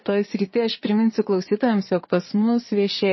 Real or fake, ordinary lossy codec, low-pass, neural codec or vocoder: fake; MP3, 24 kbps; 7.2 kHz; vocoder, 22.05 kHz, 80 mel bands, Vocos